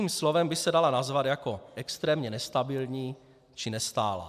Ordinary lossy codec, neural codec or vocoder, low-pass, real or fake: AAC, 96 kbps; none; 14.4 kHz; real